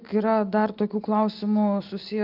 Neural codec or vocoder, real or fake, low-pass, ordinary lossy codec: none; real; 5.4 kHz; Opus, 32 kbps